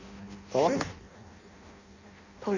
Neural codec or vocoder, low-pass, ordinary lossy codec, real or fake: codec, 16 kHz in and 24 kHz out, 0.6 kbps, FireRedTTS-2 codec; 7.2 kHz; none; fake